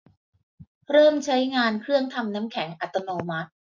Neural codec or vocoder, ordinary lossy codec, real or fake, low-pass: none; MP3, 48 kbps; real; 7.2 kHz